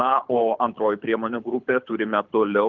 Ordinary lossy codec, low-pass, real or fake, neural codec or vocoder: Opus, 24 kbps; 7.2 kHz; fake; codec, 24 kHz, 6 kbps, HILCodec